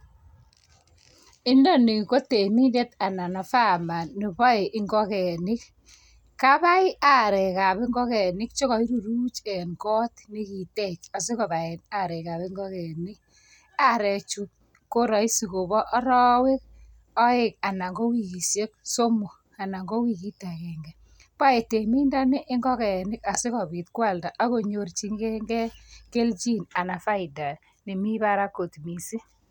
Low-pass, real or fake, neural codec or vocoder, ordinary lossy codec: 19.8 kHz; real; none; none